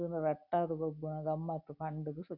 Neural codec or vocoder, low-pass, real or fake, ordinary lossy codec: none; 5.4 kHz; real; none